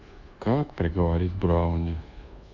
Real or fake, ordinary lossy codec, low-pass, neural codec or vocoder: fake; Opus, 64 kbps; 7.2 kHz; codec, 24 kHz, 1.2 kbps, DualCodec